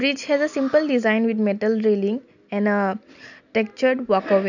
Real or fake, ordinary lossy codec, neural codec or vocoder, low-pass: real; none; none; 7.2 kHz